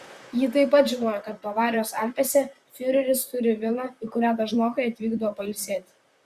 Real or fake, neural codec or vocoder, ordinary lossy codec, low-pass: fake; vocoder, 44.1 kHz, 128 mel bands, Pupu-Vocoder; Opus, 64 kbps; 14.4 kHz